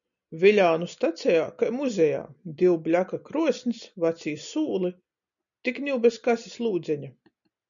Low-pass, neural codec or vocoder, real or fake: 7.2 kHz; none; real